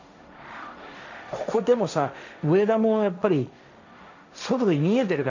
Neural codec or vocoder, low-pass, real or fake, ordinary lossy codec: codec, 16 kHz, 1.1 kbps, Voila-Tokenizer; 7.2 kHz; fake; none